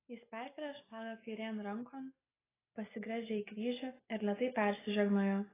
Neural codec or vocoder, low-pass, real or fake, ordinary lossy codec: none; 3.6 kHz; real; AAC, 16 kbps